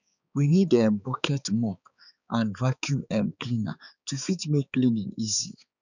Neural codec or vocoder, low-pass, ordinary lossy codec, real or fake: codec, 16 kHz, 4 kbps, X-Codec, HuBERT features, trained on balanced general audio; 7.2 kHz; none; fake